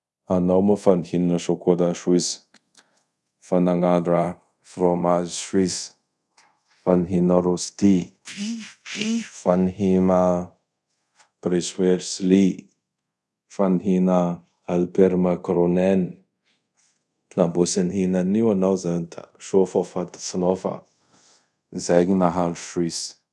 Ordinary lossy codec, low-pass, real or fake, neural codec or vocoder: none; none; fake; codec, 24 kHz, 0.5 kbps, DualCodec